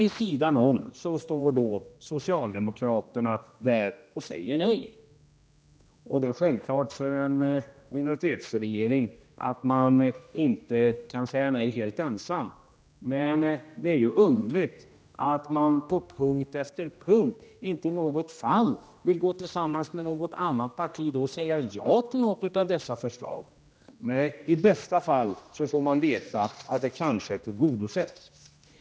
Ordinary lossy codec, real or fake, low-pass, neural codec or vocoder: none; fake; none; codec, 16 kHz, 1 kbps, X-Codec, HuBERT features, trained on general audio